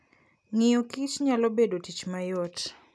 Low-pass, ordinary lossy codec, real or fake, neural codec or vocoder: none; none; real; none